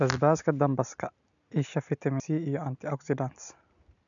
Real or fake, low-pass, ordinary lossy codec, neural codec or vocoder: real; 7.2 kHz; none; none